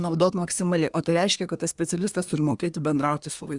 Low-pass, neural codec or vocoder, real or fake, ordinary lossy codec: 10.8 kHz; codec, 24 kHz, 1 kbps, SNAC; fake; Opus, 64 kbps